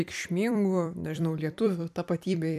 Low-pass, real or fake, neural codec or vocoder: 14.4 kHz; fake; vocoder, 44.1 kHz, 128 mel bands every 256 samples, BigVGAN v2